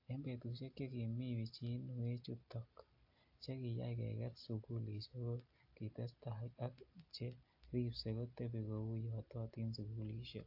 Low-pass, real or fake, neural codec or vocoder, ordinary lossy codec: 5.4 kHz; real; none; AAC, 48 kbps